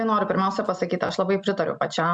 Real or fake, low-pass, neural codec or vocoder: real; 9.9 kHz; none